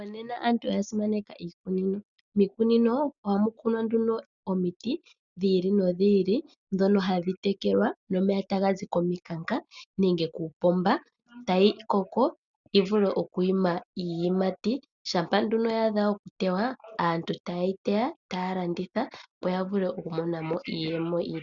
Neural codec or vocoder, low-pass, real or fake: none; 7.2 kHz; real